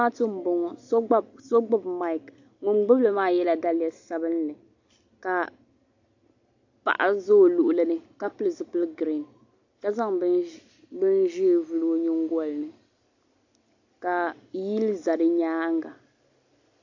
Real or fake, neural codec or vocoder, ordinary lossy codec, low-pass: real; none; AAC, 48 kbps; 7.2 kHz